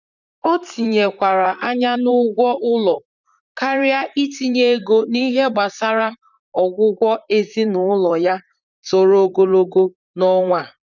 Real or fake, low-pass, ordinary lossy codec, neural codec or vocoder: fake; 7.2 kHz; none; vocoder, 44.1 kHz, 128 mel bands, Pupu-Vocoder